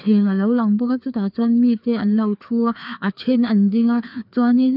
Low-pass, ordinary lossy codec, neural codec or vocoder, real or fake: 5.4 kHz; none; codec, 16 kHz, 2 kbps, FreqCodec, larger model; fake